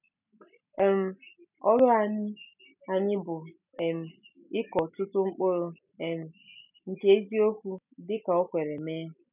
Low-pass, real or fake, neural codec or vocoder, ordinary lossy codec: 3.6 kHz; real; none; none